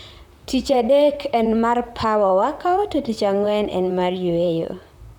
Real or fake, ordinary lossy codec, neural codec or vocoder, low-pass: fake; none; vocoder, 44.1 kHz, 128 mel bands, Pupu-Vocoder; 19.8 kHz